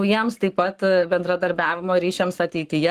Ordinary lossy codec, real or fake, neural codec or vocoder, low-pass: Opus, 24 kbps; fake; codec, 44.1 kHz, 7.8 kbps, Pupu-Codec; 14.4 kHz